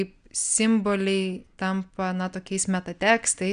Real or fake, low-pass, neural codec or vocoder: real; 9.9 kHz; none